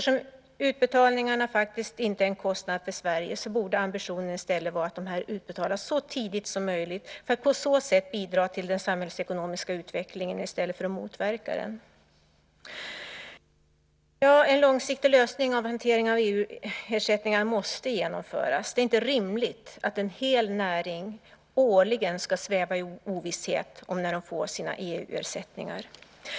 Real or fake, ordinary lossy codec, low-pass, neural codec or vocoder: real; none; none; none